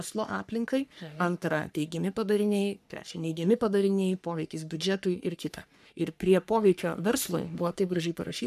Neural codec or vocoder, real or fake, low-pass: codec, 44.1 kHz, 3.4 kbps, Pupu-Codec; fake; 14.4 kHz